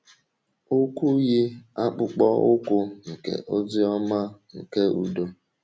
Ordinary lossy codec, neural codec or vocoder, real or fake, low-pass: none; none; real; none